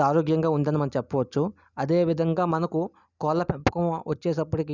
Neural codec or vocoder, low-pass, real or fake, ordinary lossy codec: none; 7.2 kHz; real; none